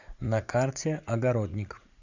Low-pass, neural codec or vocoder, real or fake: 7.2 kHz; vocoder, 44.1 kHz, 80 mel bands, Vocos; fake